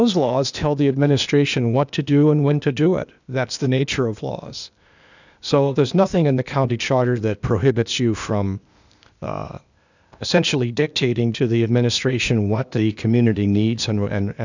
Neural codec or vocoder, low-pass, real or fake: codec, 16 kHz, 0.8 kbps, ZipCodec; 7.2 kHz; fake